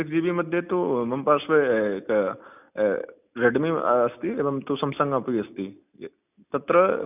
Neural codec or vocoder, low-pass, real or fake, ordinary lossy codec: none; 3.6 kHz; real; none